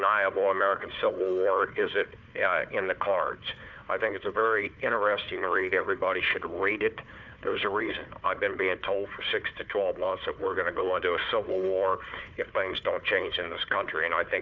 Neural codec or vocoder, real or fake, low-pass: codec, 16 kHz, 4 kbps, FunCodec, trained on Chinese and English, 50 frames a second; fake; 7.2 kHz